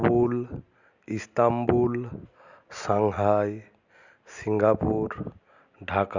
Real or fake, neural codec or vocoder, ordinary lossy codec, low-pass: real; none; Opus, 64 kbps; 7.2 kHz